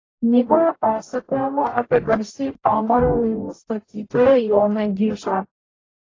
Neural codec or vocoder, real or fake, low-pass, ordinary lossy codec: codec, 44.1 kHz, 0.9 kbps, DAC; fake; 7.2 kHz; AAC, 32 kbps